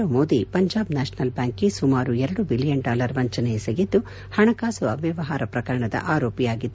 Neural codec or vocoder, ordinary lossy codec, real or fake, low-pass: none; none; real; none